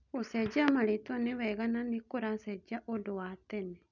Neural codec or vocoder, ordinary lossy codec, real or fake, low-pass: none; none; real; 7.2 kHz